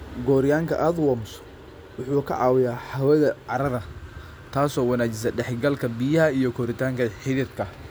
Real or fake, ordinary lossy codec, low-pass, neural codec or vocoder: real; none; none; none